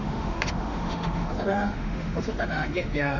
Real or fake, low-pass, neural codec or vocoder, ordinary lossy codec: fake; 7.2 kHz; autoencoder, 48 kHz, 32 numbers a frame, DAC-VAE, trained on Japanese speech; none